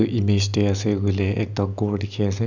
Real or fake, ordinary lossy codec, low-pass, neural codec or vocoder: real; none; 7.2 kHz; none